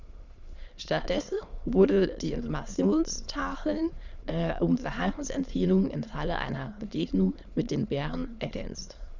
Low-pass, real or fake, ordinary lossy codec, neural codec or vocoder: 7.2 kHz; fake; Opus, 64 kbps; autoencoder, 22.05 kHz, a latent of 192 numbers a frame, VITS, trained on many speakers